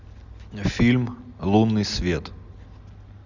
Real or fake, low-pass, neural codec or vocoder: real; 7.2 kHz; none